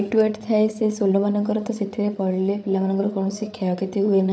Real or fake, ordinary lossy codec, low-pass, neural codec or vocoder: fake; none; none; codec, 16 kHz, 8 kbps, FreqCodec, larger model